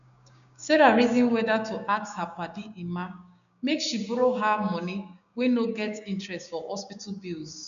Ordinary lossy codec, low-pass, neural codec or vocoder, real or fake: none; 7.2 kHz; codec, 16 kHz, 6 kbps, DAC; fake